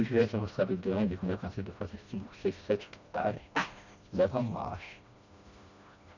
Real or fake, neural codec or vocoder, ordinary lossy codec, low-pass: fake; codec, 16 kHz, 1 kbps, FreqCodec, smaller model; none; 7.2 kHz